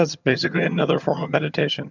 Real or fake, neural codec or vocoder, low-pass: fake; vocoder, 22.05 kHz, 80 mel bands, HiFi-GAN; 7.2 kHz